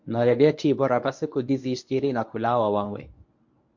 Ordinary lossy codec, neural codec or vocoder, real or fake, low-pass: MP3, 48 kbps; codec, 24 kHz, 0.9 kbps, WavTokenizer, medium speech release version 1; fake; 7.2 kHz